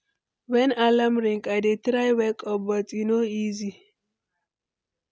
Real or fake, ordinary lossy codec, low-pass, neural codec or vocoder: real; none; none; none